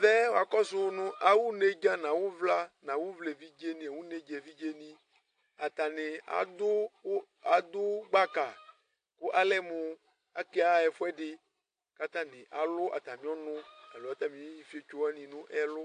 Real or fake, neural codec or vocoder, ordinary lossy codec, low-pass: real; none; AAC, 48 kbps; 9.9 kHz